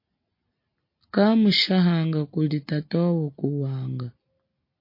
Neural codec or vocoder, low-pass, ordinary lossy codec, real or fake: none; 5.4 kHz; MP3, 32 kbps; real